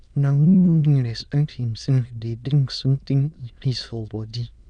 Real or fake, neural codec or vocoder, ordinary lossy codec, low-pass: fake; autoencoder, 22.05 kHz, a latent of 192 numbers a frame, VITS, trained on many speakers; none; 9.9 kHz